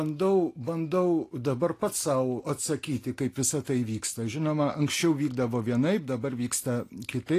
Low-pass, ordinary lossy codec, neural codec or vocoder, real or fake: 14.4 kHz; AAC, 48 kbps; none; real